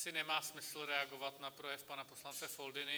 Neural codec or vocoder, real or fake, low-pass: vocoder, 48 kHz, 128 mel bands, Vocos; fake; 19.8 kHz